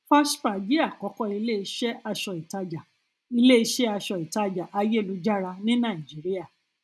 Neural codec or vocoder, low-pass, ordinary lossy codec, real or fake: none; none; none; real